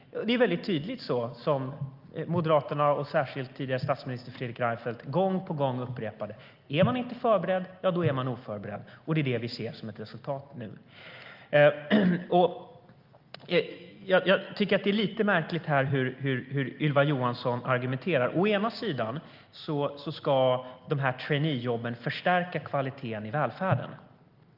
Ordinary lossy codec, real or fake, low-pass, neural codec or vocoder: Opus, 24 kbps; real; 5.4 kHz; none